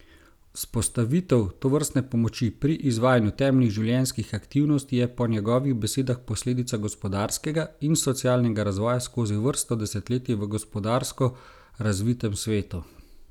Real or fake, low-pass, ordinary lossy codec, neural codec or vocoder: real; 19.8 kHz; none; none